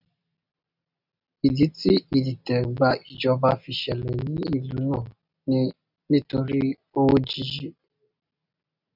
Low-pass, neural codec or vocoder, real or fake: 5.4 kHz; none; real